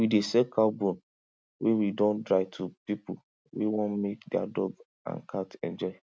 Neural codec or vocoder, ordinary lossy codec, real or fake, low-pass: none; none; real; none